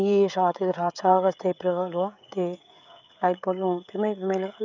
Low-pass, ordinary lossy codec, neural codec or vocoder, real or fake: 7.2 kHz; none; codec, 16 kHz, 16 kbps, FreqCodec, smaller model; fake